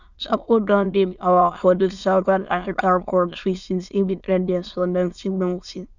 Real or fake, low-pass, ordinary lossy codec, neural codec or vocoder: fake; 7.2 kHz; none; autoencoder, 22.05 kHz, a latent of 192 numbers a frame, VITS, trained on many speakers